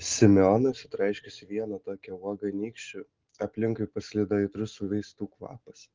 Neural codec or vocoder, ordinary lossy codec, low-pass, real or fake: none; Opus, 16 kbps; 7.2 kHz; real